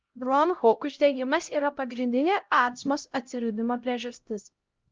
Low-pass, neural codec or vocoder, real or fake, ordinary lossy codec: 7.2 kHz; codec, 16 kHz, 0.5 kbps, X-Codec, HuBERT features, trained on LibriSpeech; fake; Opus, 24 kbps